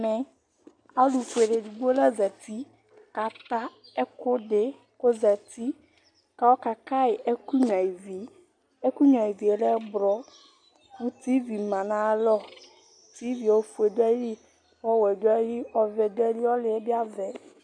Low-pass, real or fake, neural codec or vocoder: 9.9 kHz; real; none